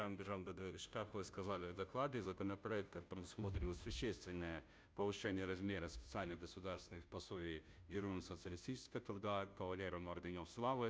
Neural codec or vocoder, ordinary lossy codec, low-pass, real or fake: codec, 16 kHz, 0.5 kbps, FunCodec, trained on Chinese and English, 25 frames a second; none; none; fake